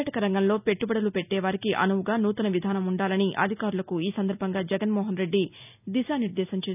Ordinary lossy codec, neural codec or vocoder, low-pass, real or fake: none; none; 3.6 kHz; real